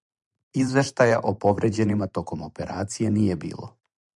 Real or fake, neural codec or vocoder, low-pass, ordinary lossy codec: fake; vocoder, 44.1 kHz, 128 mel bands every 512 samples, BigVGAN v2; 10.8 kHz; MP3, 96 kbps